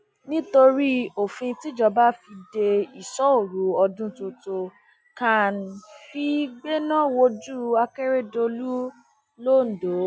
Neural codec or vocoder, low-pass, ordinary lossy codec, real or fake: none; none; none; real